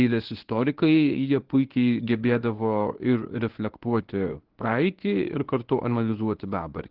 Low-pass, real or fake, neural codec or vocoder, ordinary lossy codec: 5.4 kHz; fake; codec, 24 kHz, 0.9 kbps, WavTokenizer, medium speech release version 1; Opus, 16 kbps